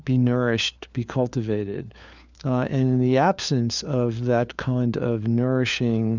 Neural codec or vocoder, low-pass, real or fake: codec, 16 kHz, 4 kbps, FunCodec, trained on LibriTTS, 50 frames a second; 7.2 kHz; fake